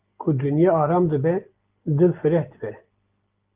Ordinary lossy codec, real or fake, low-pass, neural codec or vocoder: Opus, 32 kbps; real; 3.6 kHz; none